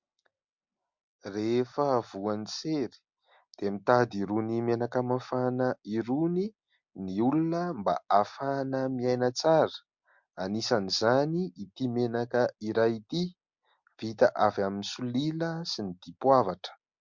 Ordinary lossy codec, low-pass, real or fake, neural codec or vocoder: MP3, 64 kbps; 7.2 kHz; real; none